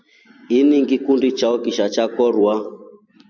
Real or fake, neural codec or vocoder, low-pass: fake; vocoder, 44.1 kHz, 128 mel bands every 256 samples, BigVGAN v2; 7.2 kHz